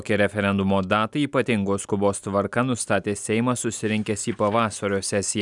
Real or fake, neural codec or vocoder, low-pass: real; none; 10.8 kHz